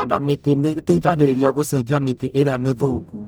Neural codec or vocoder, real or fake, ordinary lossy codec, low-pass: codec, 44.1 kHz, 0.9 kbps, DAC; fake; none; none